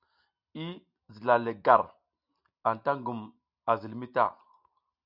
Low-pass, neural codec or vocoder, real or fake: 5.4 kHz; none; real